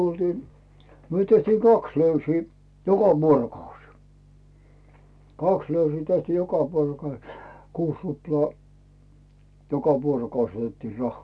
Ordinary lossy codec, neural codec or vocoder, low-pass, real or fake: none; none; none; real